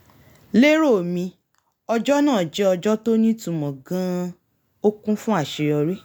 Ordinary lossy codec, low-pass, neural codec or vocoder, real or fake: none; 19.8 kHz; none; real